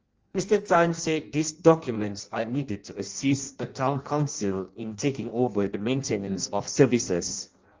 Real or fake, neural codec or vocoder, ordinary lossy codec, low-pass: fake; codec, 16 kHz in and 24 kHz out, 0.6 kbps, FireRedTTS-2 codec; Opus, 24 kbps; 7.2 kHz